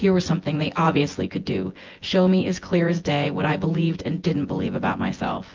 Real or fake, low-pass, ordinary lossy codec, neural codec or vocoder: fake; 7.2 kHz; Opus, 32 kbps; vocoder, 24 kHz, 100 mel bands, Vocos